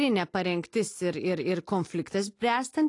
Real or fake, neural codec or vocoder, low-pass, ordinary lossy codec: real; none; 10.8 kHz; AAC, 48 kbps